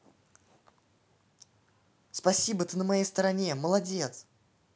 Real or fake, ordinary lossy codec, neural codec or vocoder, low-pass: real; none; none; none